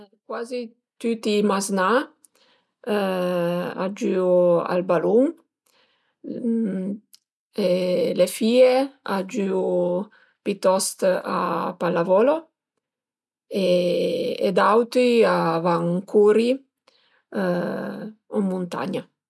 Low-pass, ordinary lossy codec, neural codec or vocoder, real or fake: none; none; none; real